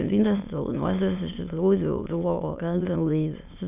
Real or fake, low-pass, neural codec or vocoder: fake; 3.6 kHz; autoencoder, 22.05 kHz, a latent of 192 numbers a frame, VITS, trained on many speakers